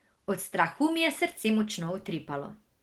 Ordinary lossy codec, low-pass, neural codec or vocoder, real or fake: Opus, 16 kbps; 19.8 kHz; none; real